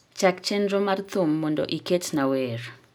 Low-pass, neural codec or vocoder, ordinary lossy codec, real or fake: none; none; none; real